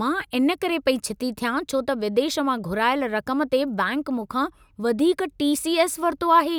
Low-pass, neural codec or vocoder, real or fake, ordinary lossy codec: none; none; real; none